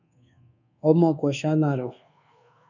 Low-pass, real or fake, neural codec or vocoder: 7.2 kHz; fake; codec, 24 kHz, 1.2 kbps, DualCodec